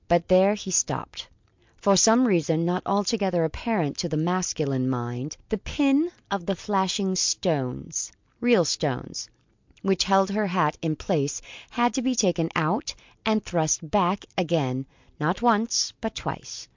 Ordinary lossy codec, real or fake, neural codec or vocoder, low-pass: MP3, 64 kbps; real; none; 7.2 kHz